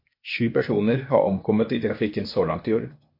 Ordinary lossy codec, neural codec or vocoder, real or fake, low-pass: MP3, 32 kbps; codec, 16 kHz, 0.8 kbps, ZipCodec; fake; 5.4 kHz